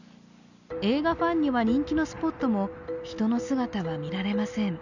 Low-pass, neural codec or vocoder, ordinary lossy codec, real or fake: 7.2 kHz; none; none; real